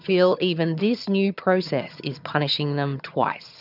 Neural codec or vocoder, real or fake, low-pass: vocoder, 22.05 kHz, 80 mel bands, HiFi-GAN; fake; 5.4 kHz